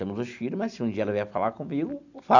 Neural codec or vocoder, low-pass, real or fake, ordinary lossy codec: none; 7.2 kHz; real; none